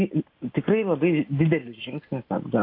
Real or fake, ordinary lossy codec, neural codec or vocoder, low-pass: real; AAC, 32 kbps; none; 5.4 kHz